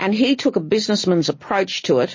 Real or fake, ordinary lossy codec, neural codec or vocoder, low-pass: real; MP3, 32 kbps; none; 7.2 kHz